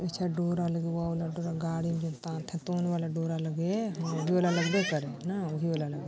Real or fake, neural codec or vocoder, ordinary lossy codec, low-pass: real; none; none; none